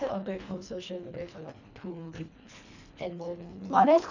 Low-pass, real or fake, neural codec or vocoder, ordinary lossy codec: 7.2 kHz; fake; codec, 24 kHz, 1.5 kbps, HILCodec; none